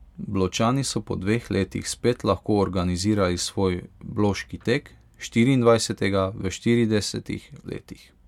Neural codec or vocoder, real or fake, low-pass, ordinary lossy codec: none; real; 19.8 kHz; MP3, 96 kbps